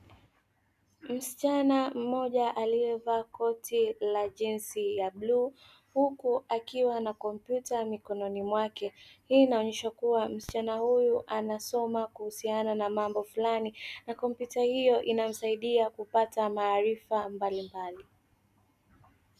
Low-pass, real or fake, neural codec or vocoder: 14.4 kHz; real; none